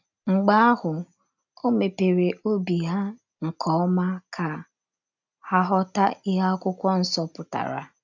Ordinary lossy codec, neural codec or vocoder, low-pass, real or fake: none; none; 7.2 kHz; real